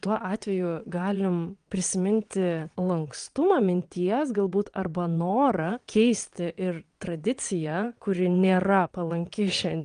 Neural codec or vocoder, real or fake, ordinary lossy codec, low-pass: vocoder, 22.05 kHz, 80 mel bands, WaveNeXt; fake; Opus, 32 kbps; 9.9 kHz